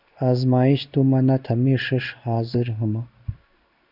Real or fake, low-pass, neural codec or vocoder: fake; 5.4 kHz; codec, 16 kHz in and 24 kHz out, 1 kbps, XY-Tokenizer